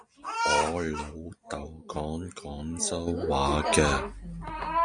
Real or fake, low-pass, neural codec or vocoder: real; 9.9 kHz; none